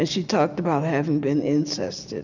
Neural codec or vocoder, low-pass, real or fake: none; 7.2 kHz; real